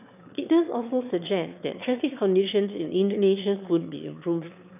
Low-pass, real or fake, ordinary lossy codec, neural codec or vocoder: 3.6 kHz; fake; none; autoencoder, 22.05 kHz, a latent of 192 numbers a frame, VITS, trained on one speaker